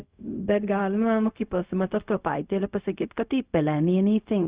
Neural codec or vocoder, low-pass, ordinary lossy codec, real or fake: codec, 16 kHz, 0.4 kbps, LongCat-Audio-Codec; 3.6 kHz; Opus, 64 kbps; fake